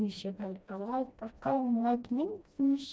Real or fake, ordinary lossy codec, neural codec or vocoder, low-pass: fake; none; codec, 16 kHz, 1 kbps, FreqCodec, smaller model; none